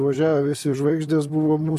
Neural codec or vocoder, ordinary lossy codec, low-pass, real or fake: vocoder, 44.1 kHz, 128 mel bands, Pupu-Vocoder; AAC, 96 kbps; 14.4 kHz; fake